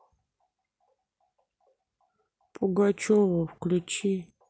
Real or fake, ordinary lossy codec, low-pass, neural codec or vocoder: real; none; none; none